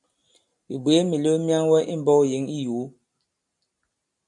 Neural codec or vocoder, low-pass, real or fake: none; 10.8 kHz; real